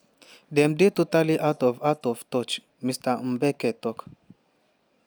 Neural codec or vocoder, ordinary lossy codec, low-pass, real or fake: none; none; none; real